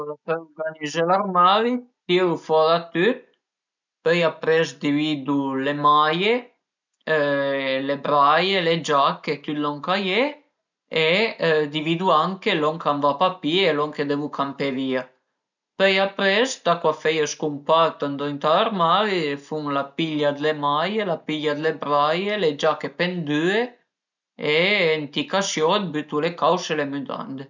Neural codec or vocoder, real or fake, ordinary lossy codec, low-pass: none; real; none; 7.2 kHz